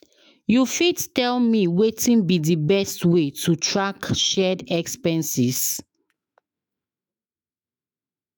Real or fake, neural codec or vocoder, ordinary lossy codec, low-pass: fake; autoencoder, 48 kHz, 128 numbers a frame, DAC-VAE, trained on Japanese speech; none; none